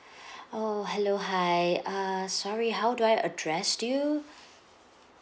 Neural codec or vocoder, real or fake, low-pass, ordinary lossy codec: none; real; none; none